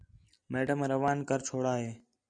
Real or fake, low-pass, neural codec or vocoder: real; 9.9 kHz; none